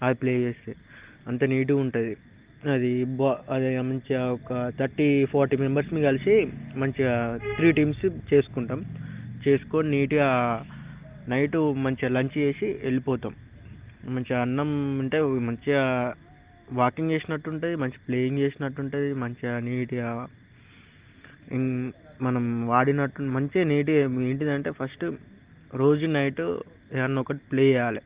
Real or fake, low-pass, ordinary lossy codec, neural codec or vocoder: real; 3.6 kHz; Opus, 32 kbps; none